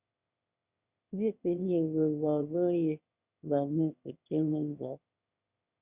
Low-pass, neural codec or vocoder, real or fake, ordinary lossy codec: 3.6 kHz; autoencoder, 22.05 kHz, a latent of 192 numbers a frame, VITS, trained on one speaker; fake; Opus, 64 kbps